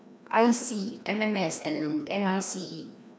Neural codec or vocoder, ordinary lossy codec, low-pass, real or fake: codec, 16 kHz, 1 kbps, FreqCodec, larger model; none; none; fake